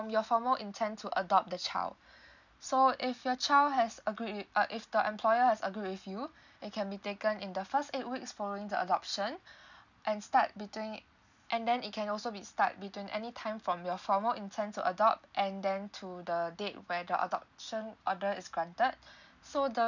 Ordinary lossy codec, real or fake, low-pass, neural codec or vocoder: none; real; 7.2 kHz; none